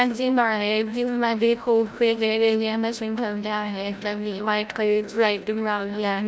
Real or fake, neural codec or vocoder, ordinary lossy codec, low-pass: fake; codec, 16 kHz, 0.5 kbps, FreqCodec, larger model; none; none